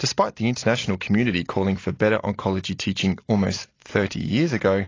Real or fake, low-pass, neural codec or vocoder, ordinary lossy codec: real; 7.2 kHz; none; AAC, 32 kbps